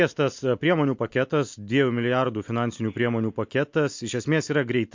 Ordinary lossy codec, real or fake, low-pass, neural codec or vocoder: MP3, 48 kbps; real; 7.2 kHz; none